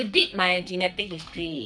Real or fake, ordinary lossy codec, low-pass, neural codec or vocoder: fake; none; 9.9 kHz; codec, 44.1 kHz, 2.6 kbps, SNAC